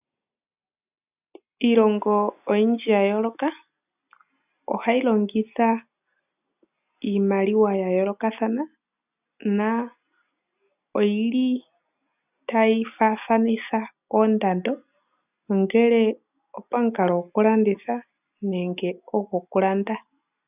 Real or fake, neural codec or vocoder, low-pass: real; none; 3.6 kHz